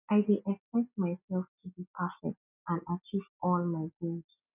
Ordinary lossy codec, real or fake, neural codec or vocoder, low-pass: none; real; none; 3.6 kHz